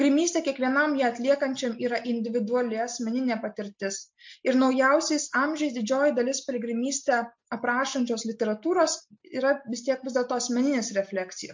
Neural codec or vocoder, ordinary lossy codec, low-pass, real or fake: none; MP3, 48 kbps; 7.2 kHz; real